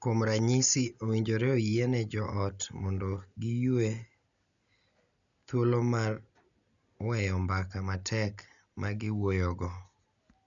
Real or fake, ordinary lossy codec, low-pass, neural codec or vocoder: real; none; 7.2 kHz; none